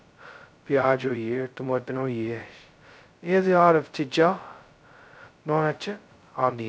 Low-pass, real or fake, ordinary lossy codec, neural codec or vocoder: none; fake; none; codec, 16 kHz, 0.2 kbps, FocalCodec